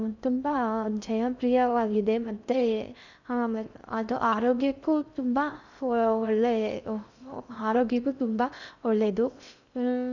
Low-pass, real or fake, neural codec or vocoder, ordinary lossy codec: 7.2 kHz; fake; codec, 16 kHz in and 24 kHz out, 0.6 kbps, FocalCodec, streaming, 2048 codes; none